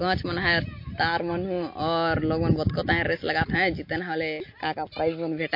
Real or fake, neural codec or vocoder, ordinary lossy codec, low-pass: real; none; MP3, 32 kbps; 5.4 kHz